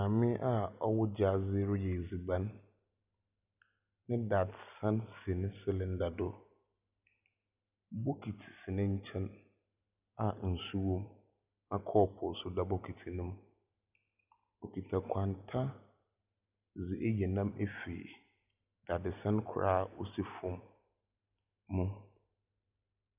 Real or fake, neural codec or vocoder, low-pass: real; none; 3.6 kHz